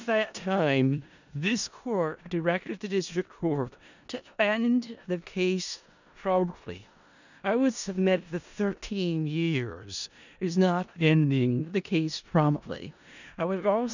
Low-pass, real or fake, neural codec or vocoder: 7.2 kHz; fake; codec, 16 kHz in and 24 kHz out, 0.4 kbps, LongCat-Audio-Codec, four codebook decoder